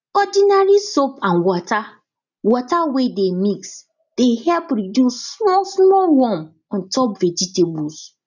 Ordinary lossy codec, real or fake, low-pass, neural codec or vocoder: none; real; 7.2 kHz; none